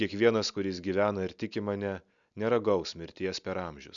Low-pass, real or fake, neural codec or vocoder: 7.2 kHz; real; none